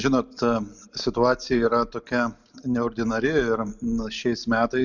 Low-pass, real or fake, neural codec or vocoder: 7.2 kHz; real; none